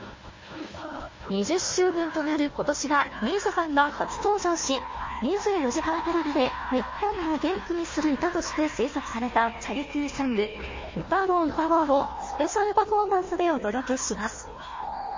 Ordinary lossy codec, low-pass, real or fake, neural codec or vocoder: MP3, 32 kbps; 7.2 kHz; fake; codec, 16 kHz, 1 kbps, FunCodec, trained on Chinese and English, 50 frames a second